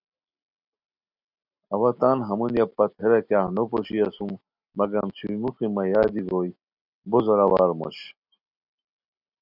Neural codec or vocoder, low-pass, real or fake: none; 5.4 kHz; real